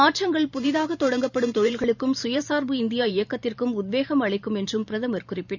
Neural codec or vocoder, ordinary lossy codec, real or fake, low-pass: vocoder, 44.1 kHz, 128 mel bands every 512 samples, BigVGAN v2; none; fake; 7.2 kHz